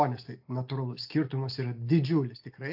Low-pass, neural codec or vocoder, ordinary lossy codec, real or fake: 5.4 kHz; none; AAC, 48 kbps; real